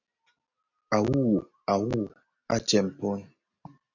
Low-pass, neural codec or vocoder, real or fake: 7.2 kHz; none; real